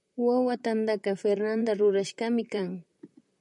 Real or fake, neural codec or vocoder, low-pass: fake; vocoder, 44.1 kHz, 128 mel bands, Pupu-Vocoder; 10.8 kHz